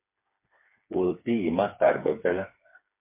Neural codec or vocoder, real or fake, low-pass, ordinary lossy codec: codec, 16 kHz, 4 kbps, FreqCodec, smaller model; fake; 3.6 kHz; MP3, 24 kbps